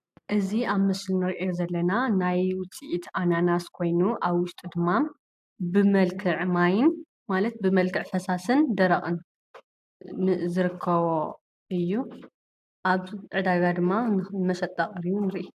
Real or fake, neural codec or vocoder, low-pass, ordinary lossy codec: real; none; 14.4 kHz; AAC, 96 kbps